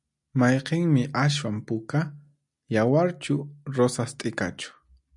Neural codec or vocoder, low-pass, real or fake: none; 10.8 kHz; real